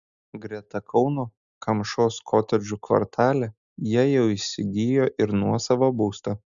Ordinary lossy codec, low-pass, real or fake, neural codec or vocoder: MP3, 96 kbps; 7.2 kHz; real; none